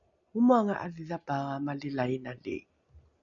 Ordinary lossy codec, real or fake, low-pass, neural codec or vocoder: MP3, 96 kbps; real; 7.2 kHz; none